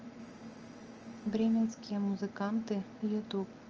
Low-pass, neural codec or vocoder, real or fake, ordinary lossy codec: 7.2 kHz; none; real; Opus, 24 kbps